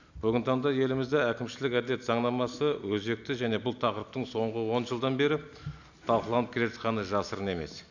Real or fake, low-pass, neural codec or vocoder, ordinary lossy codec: real; 7.2 kHz; none; none